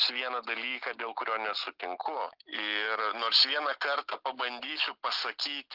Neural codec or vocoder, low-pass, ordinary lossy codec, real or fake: none; 5.4 kHz; Opus, 32 kbps; real